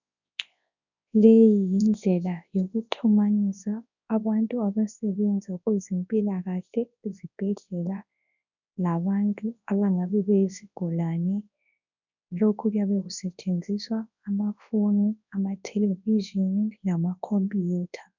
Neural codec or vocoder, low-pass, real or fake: codec, 24 kHz, 0.9 kbps, WavTokenizer, large speech release; 7.2 kHz; fake